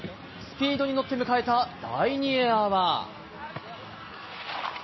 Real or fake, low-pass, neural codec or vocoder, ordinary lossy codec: real; 7.2 kHz; none; MP3, 24 kbps